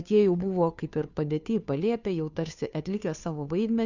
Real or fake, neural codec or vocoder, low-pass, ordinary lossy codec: fake; codec, 16 kHz, 2 kbps, FunCodec, trained on Chinese and English, 25 frames a second; 7.2 kHz; Opus, 64 kbps